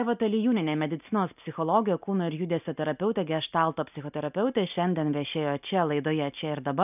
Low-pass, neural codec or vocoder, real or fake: 3.6 kHz; none; real